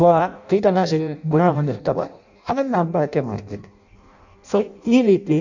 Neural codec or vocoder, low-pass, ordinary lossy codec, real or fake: codec, 16 kHz in and 24 kHz out, 0.6 kbps, FireRedTTS-2 codec; 7.2 kHz; none; fake